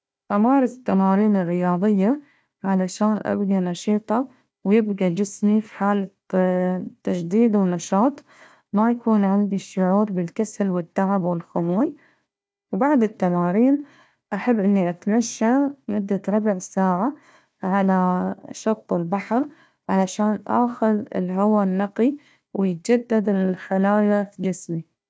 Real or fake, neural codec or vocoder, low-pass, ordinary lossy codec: fake; codec, 16 kHz, 1 kbps, FunCodec, trained on Chinese and English, 50 frames a second; none; none